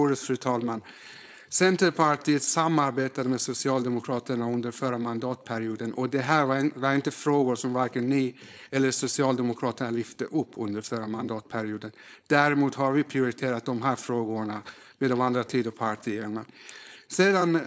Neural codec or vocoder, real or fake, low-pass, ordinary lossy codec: codec, 16 kHz, 4.8 kbps, FACodec; fake; none; none